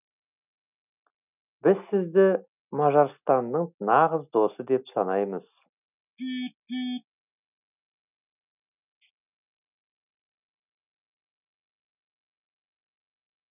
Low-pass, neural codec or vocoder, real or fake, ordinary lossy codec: 3.6 kHz; none; real; none